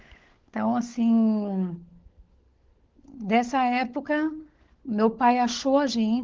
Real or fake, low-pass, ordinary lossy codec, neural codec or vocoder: fake; 7.2 kHz; Opus, 16 kbps; codec, 16 kHz, 16 kbps, FunCodec, trained on LibriTTS, 50 frames a second